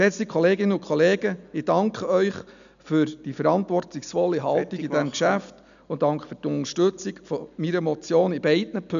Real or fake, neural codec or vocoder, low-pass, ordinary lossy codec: real; none; 7.2 kHz; none